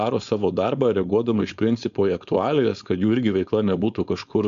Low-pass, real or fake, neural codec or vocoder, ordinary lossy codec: 7.2 kHz; fake; codec, 16 kHz, 4.8 kbps, FACodec; MP3, 64 kbps